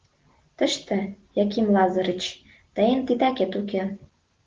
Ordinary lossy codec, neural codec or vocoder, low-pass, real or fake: Opus, 16 kbps; none; 7.2 kHz; real